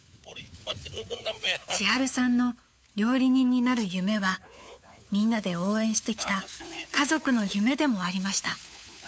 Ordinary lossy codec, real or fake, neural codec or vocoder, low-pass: none; fake; codec, 16 kHz, 4 kbps, FunCodec, trained on LibriTTS, 50 frames a second; none